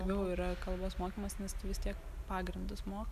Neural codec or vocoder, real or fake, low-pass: none; real; 14.4 kHz